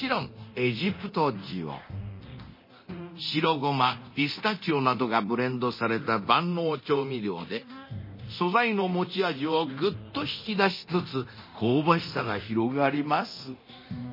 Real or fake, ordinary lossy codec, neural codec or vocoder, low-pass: fake; MP3, 24 kbps; codec, 24 kHz, 0.9 kbps, DualCodec; 5.4 kHz